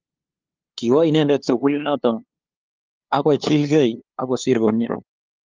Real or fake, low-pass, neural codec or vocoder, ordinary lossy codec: fake; 7.2 kHz; codec, 16 kHz, 2 kbps, FunCodec, trained on LibriTTS, 25 frames a second; Opus, 32 kbps